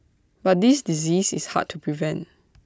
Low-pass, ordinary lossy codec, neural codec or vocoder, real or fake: none; none; none; real